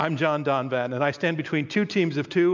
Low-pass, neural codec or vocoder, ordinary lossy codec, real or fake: 7.2 kHz; none; MP3, 64 kbps; real